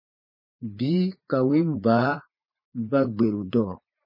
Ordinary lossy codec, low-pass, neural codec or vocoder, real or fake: MP3, 24 kbps; 5.4 kHz; vocoder, 44.1 kHz, 80 mel bands, Vocos; fake